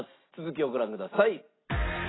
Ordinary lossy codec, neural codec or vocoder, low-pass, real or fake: AAC, 16 kbps; none; 7.2 kHz; real